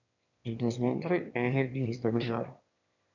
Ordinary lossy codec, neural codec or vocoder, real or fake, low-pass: none; autoencoder, 22.05 kHz, a latent of 192 numbers a frame, VITS, trained on one speaker; fake; 7.2 kHz